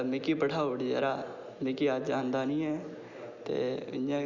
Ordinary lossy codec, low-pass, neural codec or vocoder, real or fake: none; 7.2 kHz; none; real